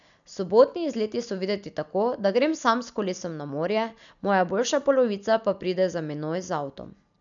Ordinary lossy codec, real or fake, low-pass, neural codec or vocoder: none; real; 7.2 kHz; none